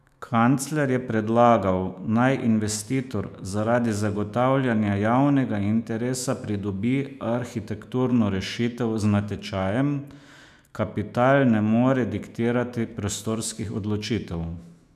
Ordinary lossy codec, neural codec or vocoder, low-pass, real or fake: none; autoencoder, 48 kHz, 128 numbers a frame, DAC-VAE, trained on Japanese speech; 14.4 kHz; fake